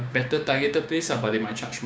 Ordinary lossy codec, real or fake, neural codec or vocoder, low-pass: none; fake; codec, 16 kHz, 6 kbps, DAC; none